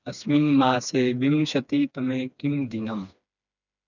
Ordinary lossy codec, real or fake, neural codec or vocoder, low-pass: none; fake; codec, 16 kHz, 2 kbps, FreqCodec, smaller model; 7.2 kHz